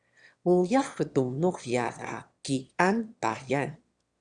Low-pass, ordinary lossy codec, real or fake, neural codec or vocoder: 9.9 kHz; Opus, 64 kbps; fake; autoencoder, 22.05 kHz, a latent of 192 numbers a frame, VITS, trained on one speaker